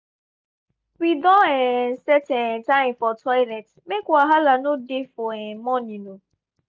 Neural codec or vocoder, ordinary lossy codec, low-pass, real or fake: none; none; none; real